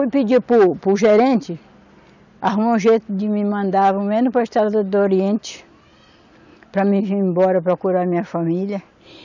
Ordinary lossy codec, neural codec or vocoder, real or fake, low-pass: none; none; real; 7.2 kHz